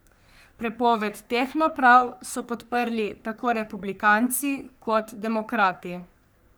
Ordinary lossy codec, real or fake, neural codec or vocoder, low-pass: none; fake; codec, 44.1 kHz, 3.4 kbps, Pupu-Codec; none